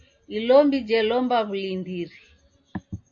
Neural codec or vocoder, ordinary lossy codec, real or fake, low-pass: none; AAC, 64 kbps; real; 7.2 kHz